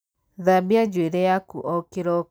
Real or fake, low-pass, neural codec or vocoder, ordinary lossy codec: real; none; none; none